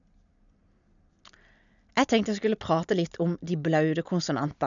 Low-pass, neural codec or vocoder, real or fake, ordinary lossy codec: 7.2 kHz; none; real; none